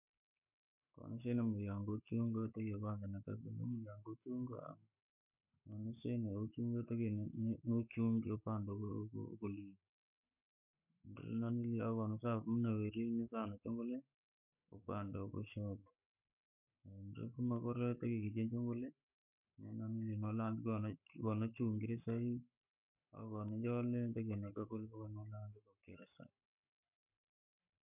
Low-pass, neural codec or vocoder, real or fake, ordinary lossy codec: 3.6 kHz; codec, 44.1 kHz, 7.8 kbps, Pupu-Codec; fake; none